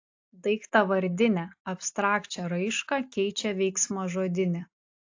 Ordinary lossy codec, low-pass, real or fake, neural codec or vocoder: AAC, 48 kbps; 7.2 kHz; real; none